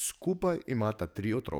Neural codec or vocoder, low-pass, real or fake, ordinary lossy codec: codec, 44.1 kHz, 7.8 kbps, DAC; none; fake; none